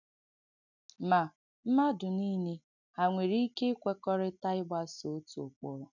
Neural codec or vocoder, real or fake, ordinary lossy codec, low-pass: none; real; none; 7.2 kHz